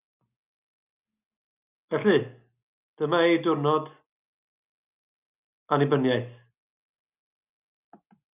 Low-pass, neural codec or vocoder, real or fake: 3.6 kHz; none; real